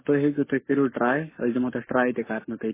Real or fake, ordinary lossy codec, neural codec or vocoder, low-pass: fake; MP3, 16 kbps; codec, 44.1 kHz, 7.8 kbps, Pupu-Codec; 3.6 kHz